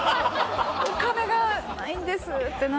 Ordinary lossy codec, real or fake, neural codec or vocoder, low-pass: none; real; none; none